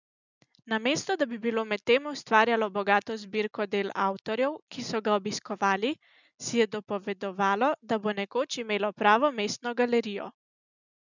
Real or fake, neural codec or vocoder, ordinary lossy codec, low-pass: real; none; none; 7.2 kHz